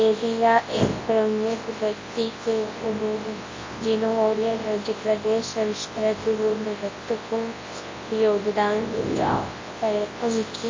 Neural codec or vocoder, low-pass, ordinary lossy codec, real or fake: codec, 24 kHz, 0.9 kbps, WavTokenizer, large speech release; 7.2 kHz; MP3, 32 kbps; fake